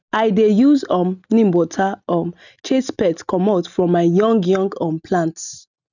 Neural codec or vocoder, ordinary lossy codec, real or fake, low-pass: none; none; real; 7.2 kHz